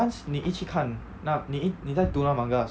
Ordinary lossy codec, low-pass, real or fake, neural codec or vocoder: none; none; real; none